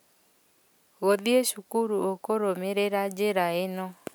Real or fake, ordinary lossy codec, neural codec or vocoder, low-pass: real; none; none; none